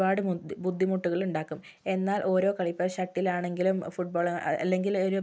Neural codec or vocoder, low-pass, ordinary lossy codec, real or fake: none; none; none; real